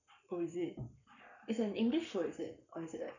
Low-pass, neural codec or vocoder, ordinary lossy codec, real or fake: 7.2 kHz; codec, 44.1 kHz, 7.8 kbps, Pupu-Codec; none; fake